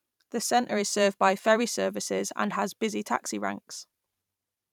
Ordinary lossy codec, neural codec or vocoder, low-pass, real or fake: none; vocoder, 44.1 kHz, 128 mel bands every 512 samples, BigVGAN v2; 19.8 kHz; fake